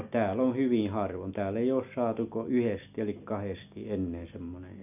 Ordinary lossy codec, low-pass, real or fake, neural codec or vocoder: none; 3.6 kHz; real; none